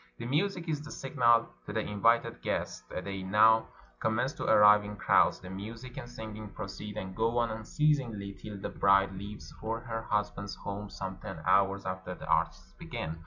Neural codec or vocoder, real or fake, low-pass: none; real; 7.2 kHz